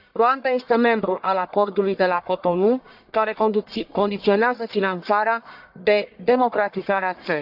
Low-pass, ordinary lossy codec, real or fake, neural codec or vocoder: 5.4 kHz; AAC, 48 kbps; fake; codec, 44.1 kHz, 1.7 kbps, Pupu-Codec